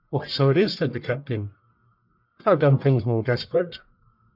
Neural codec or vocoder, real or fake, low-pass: codec, 44.1 kHz, 1.7 kbps, Pupu-Codec; fake; 5.4 kHz